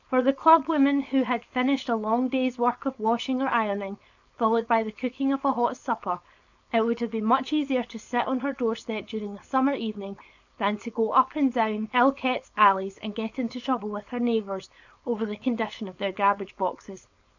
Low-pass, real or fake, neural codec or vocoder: 7.2 kHz; fake; codec, 16 kHz, 4.8 kbps, FACodec